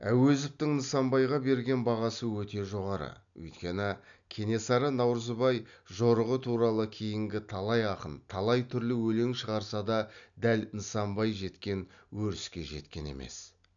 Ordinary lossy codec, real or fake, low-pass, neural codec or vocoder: none; real; 7.2 kHz; none